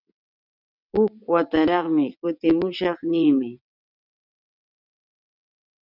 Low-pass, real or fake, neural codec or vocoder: 5.4 kHz; fake; vocoder, 22.05 kHz, 80 mel bands, WaveNeXt